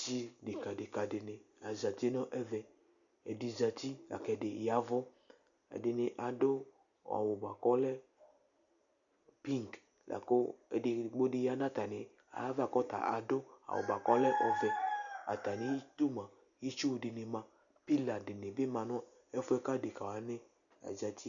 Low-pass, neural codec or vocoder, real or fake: 7.2 kHz; none; real